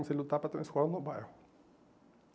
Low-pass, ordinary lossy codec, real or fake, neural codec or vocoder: none; none; real; none